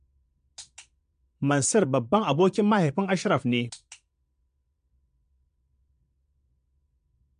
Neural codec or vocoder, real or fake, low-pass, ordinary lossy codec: vocoder, 22.05 kHz, 80 mel bands, Vocos; fake; 9.9 kHz; MP3, 64 kbps